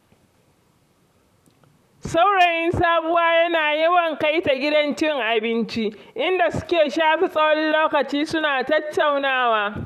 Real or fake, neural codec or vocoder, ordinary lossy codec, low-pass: fake; vocoder, 44.1 kHz, 128 mel bands, Pupu-Vocoder; none; 14.4 kHz